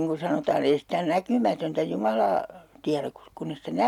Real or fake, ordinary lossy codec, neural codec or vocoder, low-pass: real; none; none; 19.8 kHz